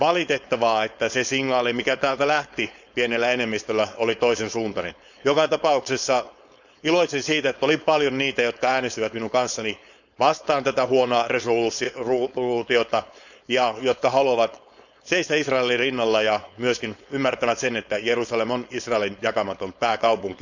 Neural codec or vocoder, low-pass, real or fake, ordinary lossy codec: codec, 16 kHz, 4.8 kbps, FACodec; 7.2 kHz; fake; none